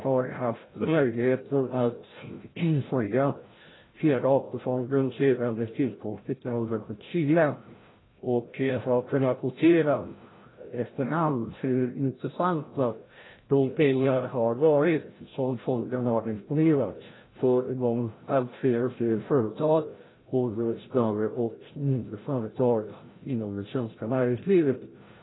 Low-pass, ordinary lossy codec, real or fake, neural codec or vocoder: 7.2 kHz; AAC, 16 kbps; fake; codec, 16 kHz, 0.5 kbps, FreqCodec, larger model